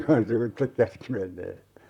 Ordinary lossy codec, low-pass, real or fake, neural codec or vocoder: none; 19.8 kHz; fake; vocoder, 44.1 kHz, 128 mel bands, Pupu-Vocoder